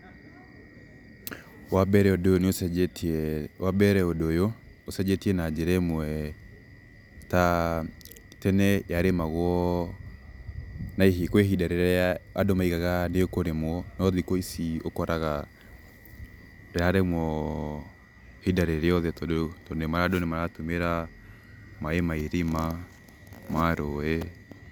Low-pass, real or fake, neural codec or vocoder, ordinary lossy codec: none; real; none; none